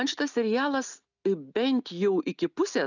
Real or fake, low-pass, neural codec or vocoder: real; 7.2 kHz; none